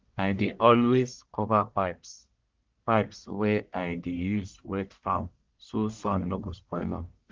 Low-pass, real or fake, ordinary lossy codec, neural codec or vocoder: 7.2 kHz; fake; Opus, 32 kbps; codec, 44.1 kHz, 1.7 kbps, Pupu-Codec